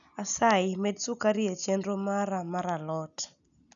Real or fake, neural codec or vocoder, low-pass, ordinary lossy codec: real; none; 7.2 kHz; none